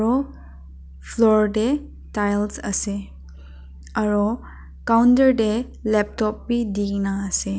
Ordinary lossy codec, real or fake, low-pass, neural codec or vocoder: none; real; none; none